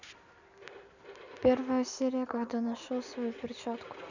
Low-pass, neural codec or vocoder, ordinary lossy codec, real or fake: 7.2 kHz; none; none; real